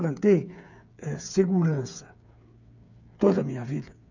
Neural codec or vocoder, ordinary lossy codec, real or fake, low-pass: codec, 16 kHz, 8 kbps, FreqCodec, smaller model; none; fake; 7.2 kHz